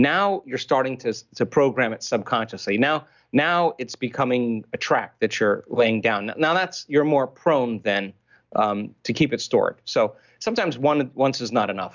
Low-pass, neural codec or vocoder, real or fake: 7.2 kHz; none; real